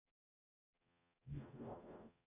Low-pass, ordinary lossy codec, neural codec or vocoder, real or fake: 3.6 kHz; AAC, 24 kbps; codec, 44.1 kHz, 0.9 kbps, DAC; fake